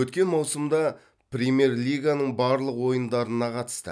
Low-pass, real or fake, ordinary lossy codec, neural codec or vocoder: none; real; none; none